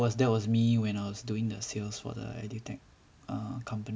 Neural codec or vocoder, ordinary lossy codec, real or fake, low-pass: none; none; real; none